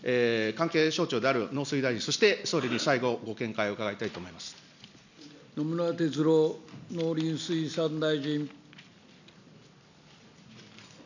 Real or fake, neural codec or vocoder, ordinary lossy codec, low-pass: real; none; none; 7.2 kHz